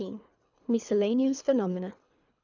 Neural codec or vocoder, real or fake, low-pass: codec, 24 kHz, 3 kbps, HILCodec; fake; 7.2 kHz